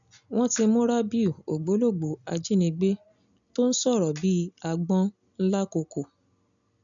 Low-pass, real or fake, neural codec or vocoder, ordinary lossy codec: 7.2 kHz; real; none; none